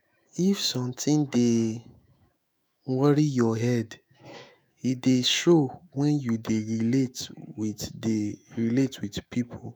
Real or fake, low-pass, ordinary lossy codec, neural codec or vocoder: fake; none; none; autoencoder, 48 kHz, 128 numbers a frame, DAC-VAE, trained on Japanese speech